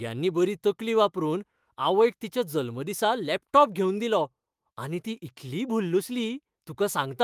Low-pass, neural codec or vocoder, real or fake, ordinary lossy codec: 14.4 kHz; autoencoder, 48 kHz, 128 numbers a frame, DAC-VAE, trained on Japanese speech; fake; Opus, 32 kbps